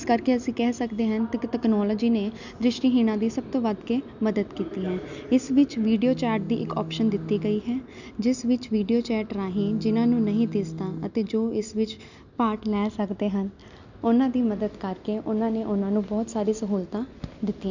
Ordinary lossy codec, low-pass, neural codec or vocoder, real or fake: none; 7.2 kHz; none; real